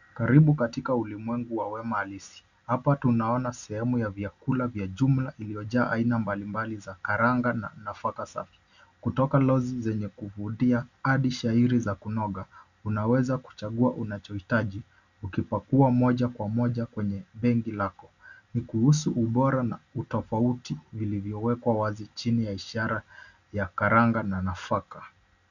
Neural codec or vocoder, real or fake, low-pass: none; real; 7.2 kHz